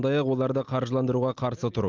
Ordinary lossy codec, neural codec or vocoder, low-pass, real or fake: Opus, 24 kbps; none; 7.2 kHz; real